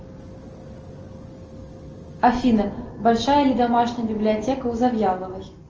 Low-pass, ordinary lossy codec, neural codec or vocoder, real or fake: 7.2 kHz; Opus, 24 kbps; none; real